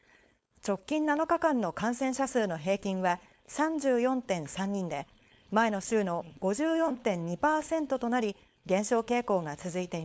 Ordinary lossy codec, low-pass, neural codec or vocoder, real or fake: none; none; codec, 16 kHz, 4.8 kbps, FACodec; fake